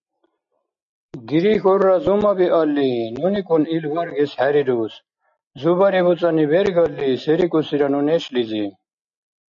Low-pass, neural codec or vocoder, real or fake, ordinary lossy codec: 7.2 kHz; none; real; MP3, 64 kbps